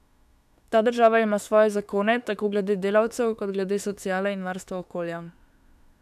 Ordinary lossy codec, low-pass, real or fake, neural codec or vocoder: none; 14.4 kHz; fake; autoencoder, 48 kHz, 32 numbers a frame, DAC-VAE, trained on Japanese speech